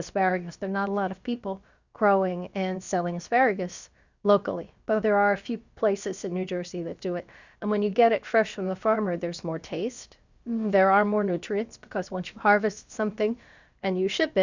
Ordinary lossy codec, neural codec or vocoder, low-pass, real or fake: Opus, 64 kbps; codec, 16 kHz, about 1 kbps, DyCAST, with the encoder's durations; 7.2 kHz; fake